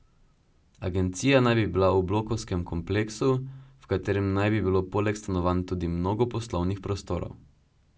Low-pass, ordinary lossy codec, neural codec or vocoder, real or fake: none; none; none; real